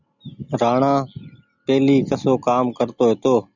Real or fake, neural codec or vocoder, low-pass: real; none; 7.2 kHz